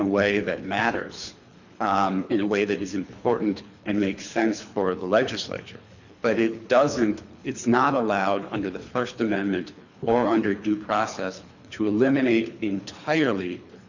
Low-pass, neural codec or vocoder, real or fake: 7.2 kHz; codec, 24 kHz, 3 kbps, HILCodec; fake